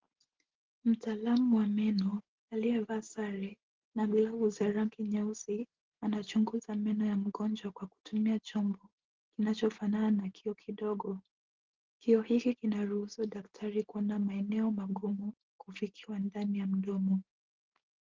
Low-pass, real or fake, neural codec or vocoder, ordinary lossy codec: 7.2 kHz; real; none; Opus, 16 kbps